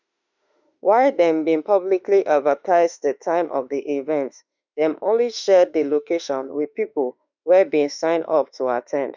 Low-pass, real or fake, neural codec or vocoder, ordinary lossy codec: 7.2 kHz; fake; autoencoder, 48 kHz, 32 numbers a frame, DAC-VAE, trained on Japanese speech; none